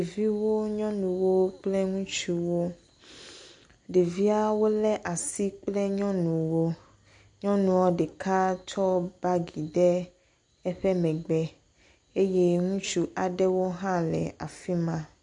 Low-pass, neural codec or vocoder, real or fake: 9.9 kHz; none; real